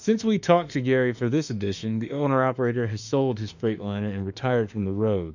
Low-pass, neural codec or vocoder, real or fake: 7.2 kHz; autoencoder, 48 kHz, 32 numbers a frame, DAC-VAE, trained on Japanese speech; fake